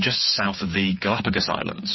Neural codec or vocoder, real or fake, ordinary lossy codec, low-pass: codec, 16 kHz, 8 kbps, FreqCodec, smaller model; fake; MP3, 24 kbps; 7.2 kHz